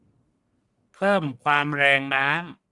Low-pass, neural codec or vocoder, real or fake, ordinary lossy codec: 10.8 kHz; codec, 44.1 kHz, 1.7 kbps, Pupu-Codec; fake; Opus, 64 kbps